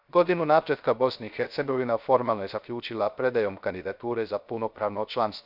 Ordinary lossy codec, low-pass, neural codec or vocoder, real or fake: none; 5.4 kHz; codec, 16 kHz, 0.3 kbps, FocalCodec; fake